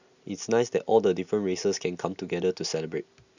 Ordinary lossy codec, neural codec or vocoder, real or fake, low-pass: none; none; real; 7.2 kHz